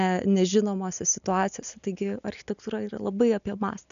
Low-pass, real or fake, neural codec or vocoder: 7.2 kHz; real; none